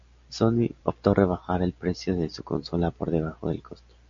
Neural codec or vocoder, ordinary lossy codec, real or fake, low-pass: none; AAC, 64 kbps; real; 7.2 kHz